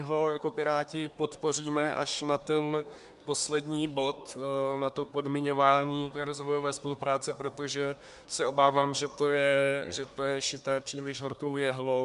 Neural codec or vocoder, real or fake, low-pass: codec, 24 kHz, 1 kbps, SNAC; fake; 10.8 kHz